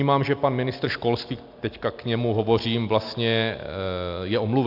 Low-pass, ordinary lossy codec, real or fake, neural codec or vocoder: 5.4 kHz; AAC, 48 kbps; real; none